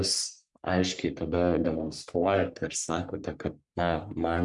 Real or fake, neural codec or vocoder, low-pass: fake; codec, 44.1 kHz, 3.4 kbps, Pupu-Codec; 10.8 kHz